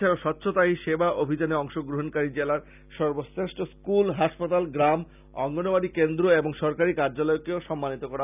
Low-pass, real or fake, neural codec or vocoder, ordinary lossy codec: 3.6 kHz; real; none; none